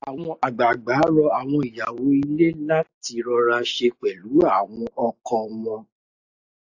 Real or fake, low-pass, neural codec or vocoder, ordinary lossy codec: real; 7.2 kHz; none; AAC, 48 kbps